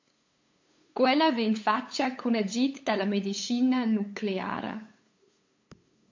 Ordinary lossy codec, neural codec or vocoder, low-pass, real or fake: MP3, 48 kbps; codec, 16 kHz, 8 kbps, FunCodec, trained on LibriTTS, 25 frames a second; 7.2 kHz; fake